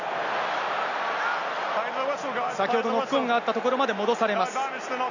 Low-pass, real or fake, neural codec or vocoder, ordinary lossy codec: 7.2 kHz; real; none; none